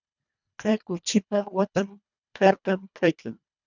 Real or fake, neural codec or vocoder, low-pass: fake; codec, 24 kHz, 1.5 kbps, HILCodec; 7.2 kHz